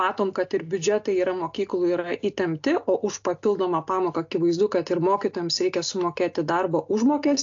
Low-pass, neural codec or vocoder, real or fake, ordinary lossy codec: 7.2 kHz; none; real; AAC, 48 kbps